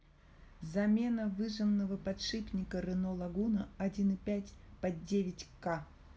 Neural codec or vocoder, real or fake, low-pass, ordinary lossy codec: none; real; none; none